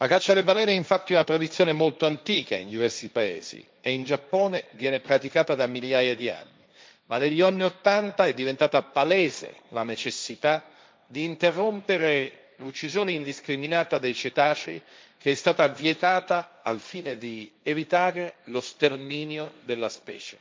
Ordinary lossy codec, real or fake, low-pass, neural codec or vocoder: none; fake; none; codec, 16 kHz, 1.1 kbps, Voila-Tokenizer